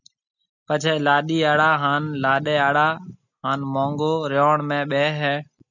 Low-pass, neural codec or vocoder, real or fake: 7.2 kHz; none; real